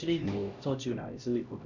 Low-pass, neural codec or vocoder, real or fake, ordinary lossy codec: 7.2 kHz; codec, 16 kHz, 1 kbps, X-Codec, HuBERT features, trained on LibriSpeech; fake; none